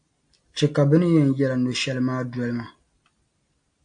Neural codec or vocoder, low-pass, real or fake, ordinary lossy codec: none; 9.9 kHz; real; AAC, 64 kbps